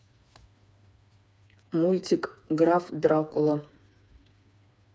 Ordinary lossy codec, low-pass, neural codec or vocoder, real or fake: none; none; codec, 16 kHz, 4 kbps, FreqCodec, smaller model; fake